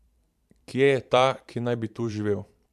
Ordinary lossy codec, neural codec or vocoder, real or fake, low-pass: MP3, 96 kbps; vocoder, 44.1 kHz, 128 mel bands every 512 samples, BigVGAN v2; fake; 14.4 kHz